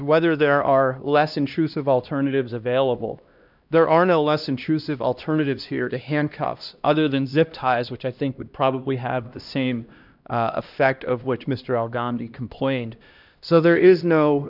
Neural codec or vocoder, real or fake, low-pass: codec, 16 kHz, 1 kbps, X-Codec, HuBERT features, trained on LibriSpeech; fake; 5.4 kHz